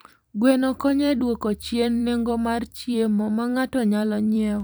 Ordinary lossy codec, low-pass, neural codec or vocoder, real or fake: none; none; vocoder, 44.1 kHz, 128 mel bands every 256 samples, BigVGAN v2; fake